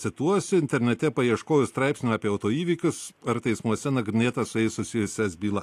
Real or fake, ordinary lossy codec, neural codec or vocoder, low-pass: real; AAC, 64 kbps; none; 14.4 kHz